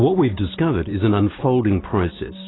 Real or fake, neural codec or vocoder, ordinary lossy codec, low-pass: real; none; AAC, 16 kbps; 7.2 kHz